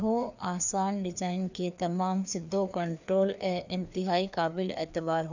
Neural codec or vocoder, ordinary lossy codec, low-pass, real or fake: codec, 16 kHz, 2 kbps, FreqCodec, larger model; none; 7.2 kHz; fake